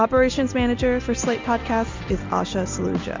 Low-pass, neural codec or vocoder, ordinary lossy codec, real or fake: 7.2 kHz; none; MP3, 64 kbps; real